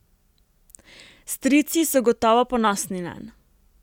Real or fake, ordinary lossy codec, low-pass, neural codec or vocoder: real; none; 19.8 kHz; none